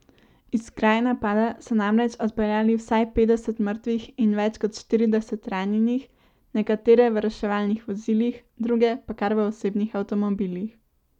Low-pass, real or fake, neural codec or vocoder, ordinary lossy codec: 19.8 kHz; real; none; none